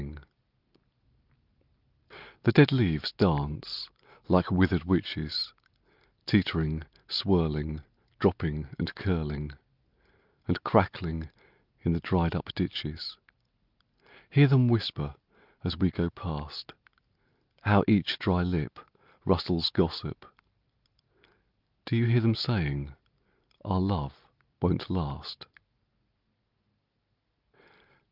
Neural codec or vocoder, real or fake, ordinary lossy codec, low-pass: none; real; Opus, 24 kbps; 5.4 kHz